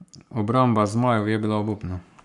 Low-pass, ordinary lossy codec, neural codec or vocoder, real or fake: 10.8 kHz; none; codec, 44.1 kHz, 7.8 kbps, Pupu-Codec; fake